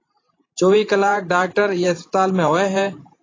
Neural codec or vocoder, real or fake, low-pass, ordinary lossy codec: vocoder, 44.1 kHz, 128 mel bands every 512 samples, BigVGAN v2; fake; 7.2 kHz; AAC, 32 kbps